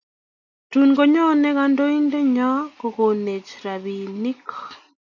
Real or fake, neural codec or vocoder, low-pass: real; none; 7.2 kHz